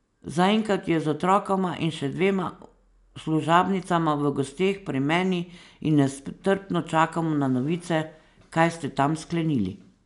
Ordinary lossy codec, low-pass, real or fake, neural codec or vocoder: none; 10.8 kHz; real; none